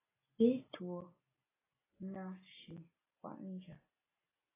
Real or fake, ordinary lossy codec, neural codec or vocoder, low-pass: real; AAC, 16 kbps; none; 3.6 kHz